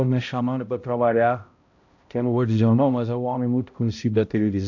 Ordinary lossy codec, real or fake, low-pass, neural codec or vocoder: AAC, 48 kbps; fake; 7.2 kHz; codec, 16 kHz, 0.5 kbps, X-Codec, HuBERT features, trained on balanced general audio